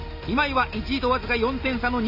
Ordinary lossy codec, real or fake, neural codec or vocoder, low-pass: none; real; none; 5.4 kHz